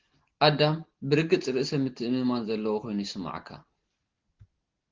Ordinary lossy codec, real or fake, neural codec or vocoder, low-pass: Opus, 16 kbps; real; none; 7.2 kHz